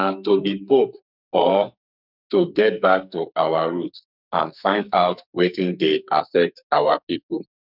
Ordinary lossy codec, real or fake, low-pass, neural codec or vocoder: none; fake; 5.4 kHz; codec, 44.1 kHz, 2.6 kbps, SNAC